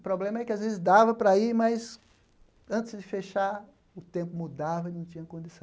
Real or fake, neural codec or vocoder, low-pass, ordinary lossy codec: real; none; none; none